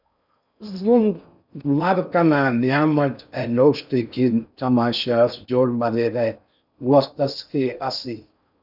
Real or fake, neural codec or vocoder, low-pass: fake; codec, 16 kHz in and 24 kHz out, 0.6 kbps, FocalCodec, streaming, 4096 codes; 5.4 kHz